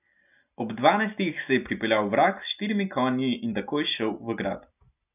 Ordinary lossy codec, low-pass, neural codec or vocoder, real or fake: none; 3.6 kHz; none; real